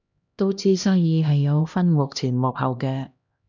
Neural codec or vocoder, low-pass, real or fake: codec, 16 kHz, 1 kbps, X-Codec, HuBERT features, trained on LibriSpeech; 7.2 kHz; fake